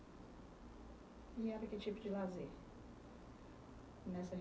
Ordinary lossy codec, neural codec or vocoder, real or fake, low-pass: none; none; real; none